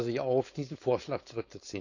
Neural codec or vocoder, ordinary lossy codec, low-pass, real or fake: codec, 16 kHz, 4.8 kbps, FACodec; none; 7.2 kHz; fake